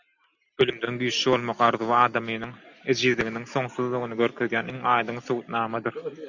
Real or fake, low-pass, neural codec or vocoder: real; 7.2 kHz; none